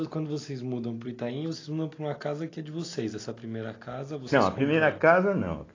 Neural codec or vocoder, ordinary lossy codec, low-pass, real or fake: none; AAC, 32 kbps; 7.2 kHz; real